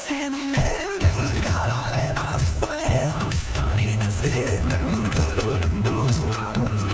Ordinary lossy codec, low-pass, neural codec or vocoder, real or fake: none; none; codec, 16 kHz, 1 kbps, FunCodec, trained on LibriTTS, 50 frames a second; fake